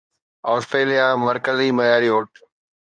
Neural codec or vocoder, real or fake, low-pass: codec, 24 kHz, 0.9 kbps, WavTokenizer, medium speech release version 2; fake; 9.9 kHz